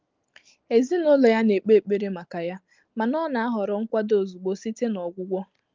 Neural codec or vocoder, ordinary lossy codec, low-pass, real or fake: none; Opus, 32 kbps; 7.2 kHz; real